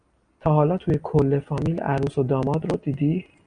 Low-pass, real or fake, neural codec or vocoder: 9.9 kHz; real; none